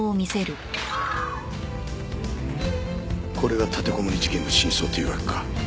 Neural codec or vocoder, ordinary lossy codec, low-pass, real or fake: none; none; none; real